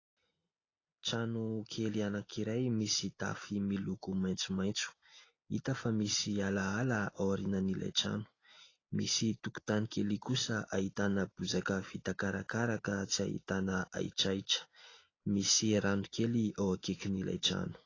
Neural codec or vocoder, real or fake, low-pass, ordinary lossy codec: none; real; 7.2 kHz; AAC, 32 kbps